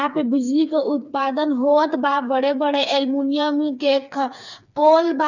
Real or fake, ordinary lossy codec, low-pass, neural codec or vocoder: fake; none; 7.2 kHz; codec, 16 kHz, 4 kbps, FreqCodec, smaller model